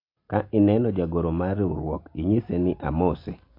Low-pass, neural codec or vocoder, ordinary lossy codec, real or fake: 5.4 kHz; none; AAC, 32 kbps; real